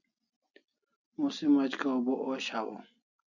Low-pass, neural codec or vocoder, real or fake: 7.2 kHz; none; real